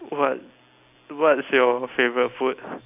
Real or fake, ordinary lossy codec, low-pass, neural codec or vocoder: real; AAC, 32 kbps; 3.6 kHz; none